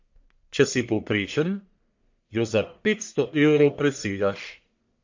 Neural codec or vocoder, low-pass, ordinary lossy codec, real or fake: codec, 44.1 kHz, 1.7 kbps, Pupu-Codec; 7.2 kHz; MP3, 48 kbps; fake